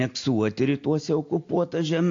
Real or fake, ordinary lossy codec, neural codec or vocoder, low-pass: real; AAC, 48 kbps; none; 7.2 kHz